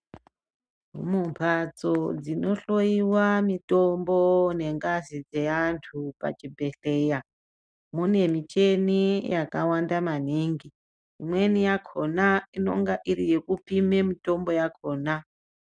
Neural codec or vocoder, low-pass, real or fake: none; 9.9 kHz; real